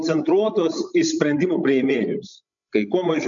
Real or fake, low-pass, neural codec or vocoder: fake; 7.2 kHz; codec, 16 kHz, 16 kbps, FreqCodec, larger model